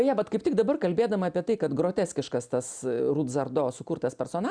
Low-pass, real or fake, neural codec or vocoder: 9.9 kHz; real; none